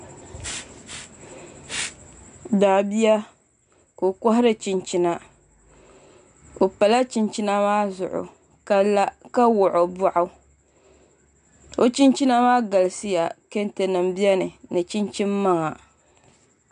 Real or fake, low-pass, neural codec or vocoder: real; 9.9 kHz; none